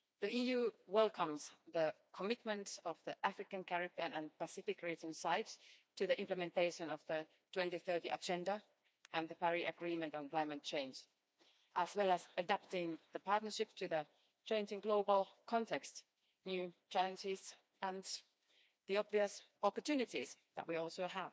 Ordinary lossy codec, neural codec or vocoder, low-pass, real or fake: none; codec, 16 kHz, 2 kbps, FreqCodec, smaller model; none; fake